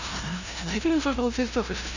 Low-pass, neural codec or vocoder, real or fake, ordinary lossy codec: 7.2 kHz; codec, 16 kHz, 0.5 kbps, FunCodec, trained on LibriTTS, 25 frames a second; fake; none